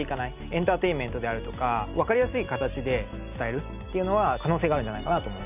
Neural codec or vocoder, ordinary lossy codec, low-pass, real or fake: none; none; 3.6 kHz; real